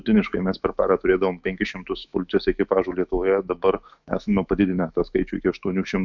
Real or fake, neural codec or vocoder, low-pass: fake; autoencoder, 48 kHz, 128 numbers a frame, DAC-VAE, trained on Japanese speech; 7.2 kHz